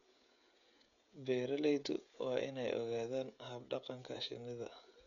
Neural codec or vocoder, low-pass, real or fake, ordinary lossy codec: codec, 16 kHz, 16 kbps, FreqCodec, smaller model; 7.2 kHz; fake; none